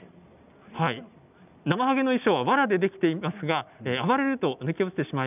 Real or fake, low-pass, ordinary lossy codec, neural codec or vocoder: real; 3.6 kHz; none; none